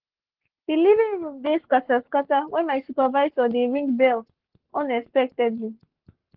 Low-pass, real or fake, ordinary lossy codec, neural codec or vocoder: 5.4 kHz; fake; Opus, 16 kbps; codec, 44.1 kHz, 7.8 kbps, Pupu-Codec